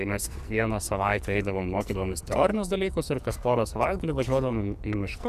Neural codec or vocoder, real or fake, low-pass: codec, 44.1 kHz, 2.6 kbps, SNAC; fake; 14.4 kHz